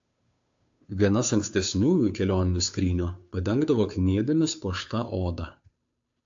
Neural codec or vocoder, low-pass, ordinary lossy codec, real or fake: codec, 16 kHz, 2 kbps, FunCodec, trained on Chinese and English, 25 frames a second; 7.2 kHz; AAC, 64 kbps; fake